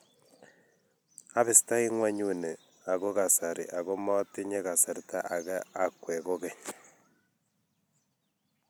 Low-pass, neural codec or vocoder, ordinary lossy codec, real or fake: none; none; none; real